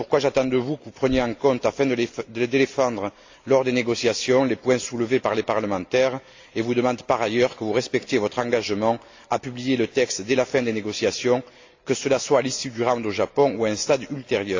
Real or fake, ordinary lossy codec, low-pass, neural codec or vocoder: real; AAC, 48 kbps; 7.2 kHz; none